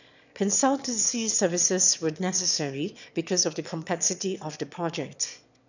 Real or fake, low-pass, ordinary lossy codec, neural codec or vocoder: fake; 7.2 kHz; none; autoencoder, 22.05 kHz, a latent of 192 numbers a frame, VITS, trained on one speaker